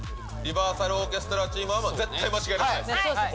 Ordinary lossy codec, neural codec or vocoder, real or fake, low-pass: none; none; real; none